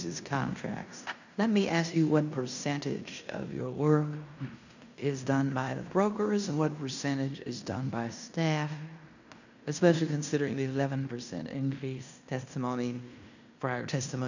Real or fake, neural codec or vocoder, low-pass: fake; codec, 16 kHz in and 24 kHz out, 0.9 kbps, LongCat-Audio-Codec, fine tuned four codebook decoder; 7.2 kHz